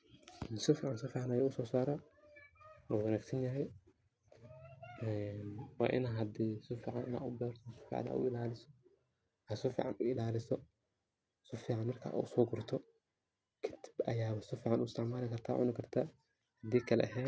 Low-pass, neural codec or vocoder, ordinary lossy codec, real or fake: none; none; none; real